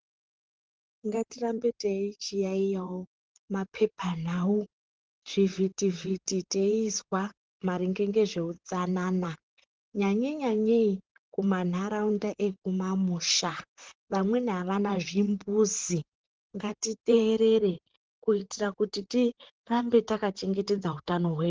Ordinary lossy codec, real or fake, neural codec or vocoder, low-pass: Opus, 16 kbps; fake; vocoder, 44.1 kHz, 128 mel bands, Pupu-Vocoder; 7.2 kHz